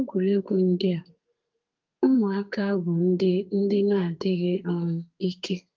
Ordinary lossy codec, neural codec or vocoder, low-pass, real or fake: Opus, 32 kbps; codec, 32 kHz, 1.9 kbps, SNAC; 7.2 kHz; fake